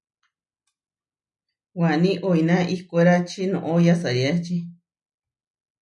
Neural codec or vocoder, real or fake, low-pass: none; real; 10.8 kHz